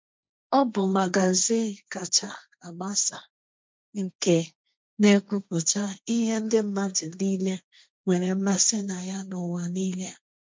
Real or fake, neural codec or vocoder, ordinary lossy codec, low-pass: fake; codec, 16 kHz, 1.1 kbps, Voila-Tokenizer; none; none